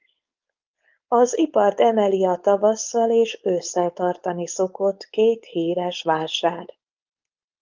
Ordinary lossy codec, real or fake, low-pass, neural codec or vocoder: Opus, 24 kbps; fake; 7.2 kHz; codec, 16 kHz, 4.8 kbps, FACodec